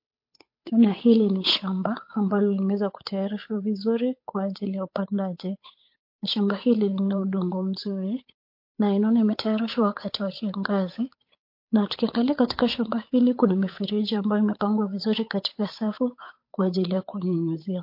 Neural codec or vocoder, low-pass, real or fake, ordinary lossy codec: codec, 16 kHz, 8 kbps, FunCodec, trained on Chinese and English, 25 frames a second; 5.4 kHz; fake; MP3, 32 kbps